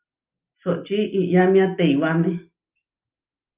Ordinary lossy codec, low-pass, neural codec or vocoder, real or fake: Opus, 24 kbps; 3.6 kHz; none; real